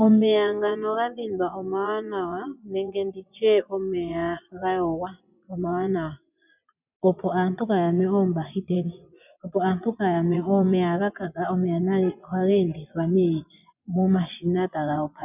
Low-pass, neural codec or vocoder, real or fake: 3.6 kHz; vocoder, 24 kHz, 100 mel bands, Vocos; fake